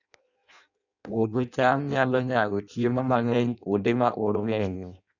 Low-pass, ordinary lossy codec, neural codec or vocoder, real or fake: 7.2 kHz; none; codec, 16 kHz in and 24 kHz out, 0.6 kbps, FireRedTTS-2 codec; fake